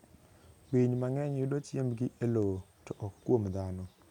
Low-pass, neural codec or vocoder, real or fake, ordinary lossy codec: 19.8 kHz; none; real; none